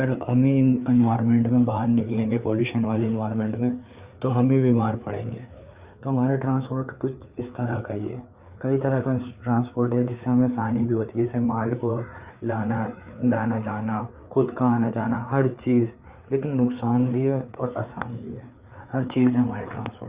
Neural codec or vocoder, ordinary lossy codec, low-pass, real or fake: codec, 16 kHz, 4 kbps, FreqCodec, larger model; Opus, 64 kbps; 3.6 kHz; fake